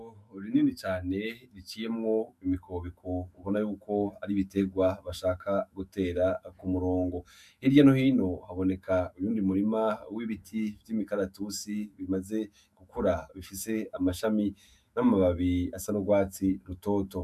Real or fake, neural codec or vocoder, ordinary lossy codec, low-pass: fake; autoencoder, 48 kHz, 128 numbers a frame, DAC-VAE, trained on Japanese speech; MP3, 96 kbps; 14.4 kHz